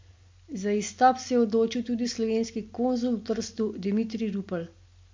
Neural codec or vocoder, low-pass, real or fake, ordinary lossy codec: none; 7.2 kHz; real; MP3, 48 kbps